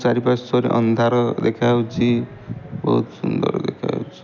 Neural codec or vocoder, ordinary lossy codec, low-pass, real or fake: none; none; 7.2 kHz; real